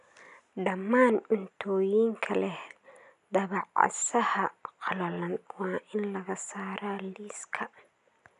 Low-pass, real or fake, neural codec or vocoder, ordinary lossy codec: 10.8 kHz; real; none; none